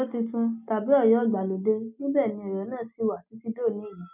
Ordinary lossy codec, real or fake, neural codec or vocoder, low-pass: none; real; none; 3.6 kHz